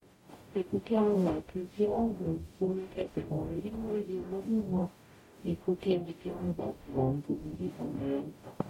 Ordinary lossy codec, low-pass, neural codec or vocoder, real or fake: MP3, 64 kbps; 19.8 kHz; codec, 44.1 kHz, 0.9 kbps, DAC; fake